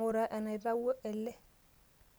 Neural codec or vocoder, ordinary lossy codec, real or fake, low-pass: none; none; real; none